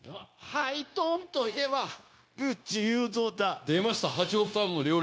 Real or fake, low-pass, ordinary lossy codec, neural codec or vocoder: fake; none; none; codec, 16 kHz, 0.9 kbps, LongCat-Audio-Codec